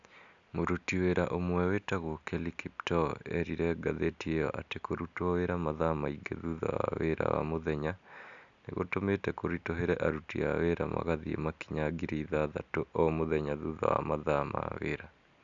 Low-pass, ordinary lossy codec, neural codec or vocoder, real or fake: 7.2 kHz; none; none; real